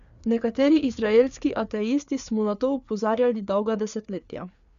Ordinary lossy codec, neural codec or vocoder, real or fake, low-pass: none; codec, 16 kHz, 4 kbps, FreqCodec, larger model; fake; 7.2 kHz